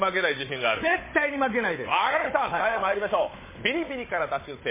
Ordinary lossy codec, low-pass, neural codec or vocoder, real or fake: MP3, 16 kbps; 3.6 kHz; codec, 16 kHz, 16 kbps, FunCodec, trained on Chinese and English, 50 frames a second; fake